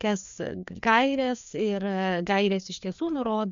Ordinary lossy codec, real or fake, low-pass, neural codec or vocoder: MP3, 64 kbps; fake; 7.2 kHz; codec, 16 kHz, 2 kbps, FreqCodec, larger model